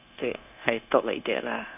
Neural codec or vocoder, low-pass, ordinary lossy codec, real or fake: codec, 16 kHz, 0.9 kbps, LongCat-Audio-Codec; 3.6 kHz; none; fake